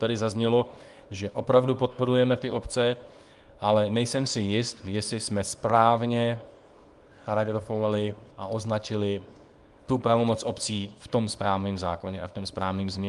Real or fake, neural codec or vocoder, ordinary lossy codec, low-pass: fake; codec, 24 kHz, 0.9 kbps, WavTokenizer, small release; Opus, 32 kbps; 10.8 kHz